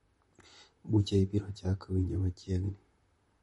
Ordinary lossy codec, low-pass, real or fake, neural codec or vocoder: MP3, 48 kbps; 19.8 kHz; fake; vocoder, 44.1 kHz, 128 mel bands, Pupu-Vocoder